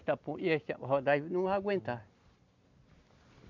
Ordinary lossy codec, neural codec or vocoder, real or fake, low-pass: none; none; real; 7.2 kHz